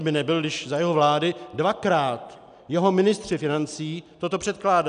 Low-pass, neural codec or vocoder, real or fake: 9.9 kHz; none; real